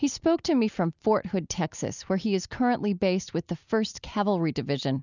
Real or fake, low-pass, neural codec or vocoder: real; 7.2 kHz; none